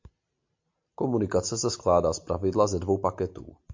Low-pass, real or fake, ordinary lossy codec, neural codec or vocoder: 7.2 kHz; real; MP3, 48 kbps; none